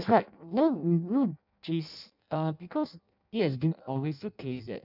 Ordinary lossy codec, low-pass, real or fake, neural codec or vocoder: none; 5.4 kHz; fake; codec, 16 kHz in and 24 kHz out, 0.6 kbps, FireRedTTS-2 codec